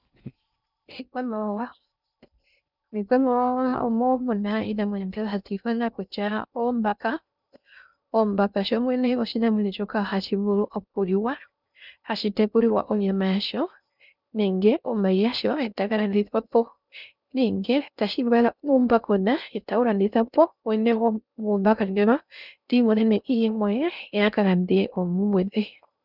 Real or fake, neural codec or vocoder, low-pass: fake; codec, 16 kHz in and 24 kHz out, 0.6 kbps, FocalCodec, streaming, 2048 codes; 5.4 kHz